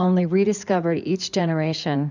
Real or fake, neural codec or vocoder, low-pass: fake; codec, 16 kHz in and 24 kHz out, 2.2 kbps, FireRedTTS-2 codec; 7.2 kHz